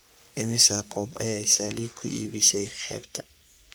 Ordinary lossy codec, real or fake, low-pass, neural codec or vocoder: none; fake; none; codec, 44.1 kHz, 3.4 kbps, Pupu-Codec